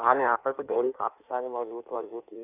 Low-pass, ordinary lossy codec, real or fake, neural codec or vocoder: 3.6 kHz; none; fake; codec, 16 kHz in and 24 kHz out, 1.1 kbps, FireRedTTS-2 codec